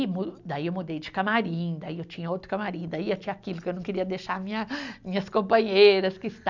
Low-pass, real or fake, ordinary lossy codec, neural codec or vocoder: 7.2 kHz; real; none; none